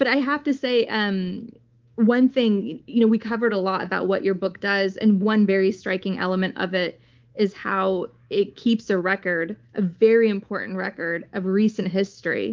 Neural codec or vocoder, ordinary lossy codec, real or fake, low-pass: codec, 24 kHz, 3.1 kbps, DualCodec; Opus, 32 kbps; fake; 7.2 kHz